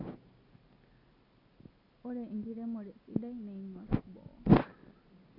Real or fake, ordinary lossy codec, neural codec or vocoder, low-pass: real; none; none; 5.4 kHz